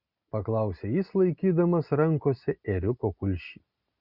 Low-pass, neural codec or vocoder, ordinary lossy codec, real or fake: 5.4 kHz; none; Opus, 64 kbps; real